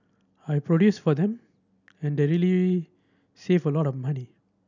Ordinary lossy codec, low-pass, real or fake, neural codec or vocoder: none; 7.2 kHz; real; none